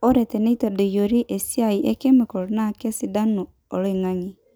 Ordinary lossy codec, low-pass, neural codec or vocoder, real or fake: none; none; none; real